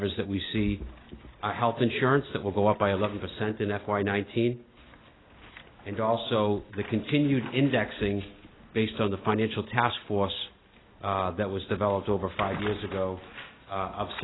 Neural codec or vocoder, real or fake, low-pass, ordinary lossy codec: none; real; 7.2 kHz; AAC, 16 kbps